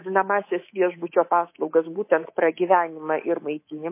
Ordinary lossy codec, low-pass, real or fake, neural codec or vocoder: MP3, 24 kbps; 3.6 kHz; fake; codec, 24 kHz, 3.1 kbps, DualCodec